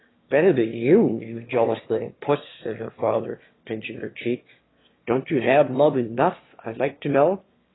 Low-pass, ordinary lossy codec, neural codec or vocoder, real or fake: 7.2 kHz; AAC, 16 kbps; autoencoder, 22.05 kHz, a latent of 192 numbers a frame, VITS, trained on one speaker; fake